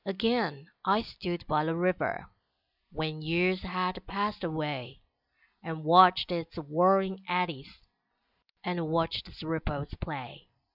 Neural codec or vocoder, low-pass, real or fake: none; 5.4 kHz; real